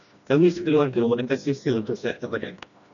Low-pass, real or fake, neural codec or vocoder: 7.2 kHz; fake; codec, 16 kHz, 1 kbps, FreqCodec, smaller model